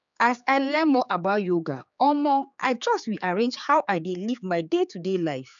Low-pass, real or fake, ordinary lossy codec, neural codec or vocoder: 7.2 kHz; fake; none; codec, 16 kHz, 4 kbps, X-Codec, HuBERT features, trained on general audio